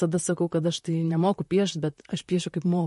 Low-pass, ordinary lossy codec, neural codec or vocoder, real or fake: 14.4 kHz; MP3, 48 kbps; vocoder, 44.1 kHz, 128 mel bands, Pupu-Vocoder; fake